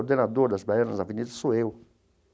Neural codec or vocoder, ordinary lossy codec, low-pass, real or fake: none; none; none; real